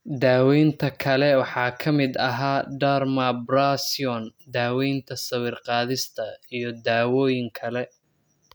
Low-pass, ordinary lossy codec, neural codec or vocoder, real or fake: none; none; none; real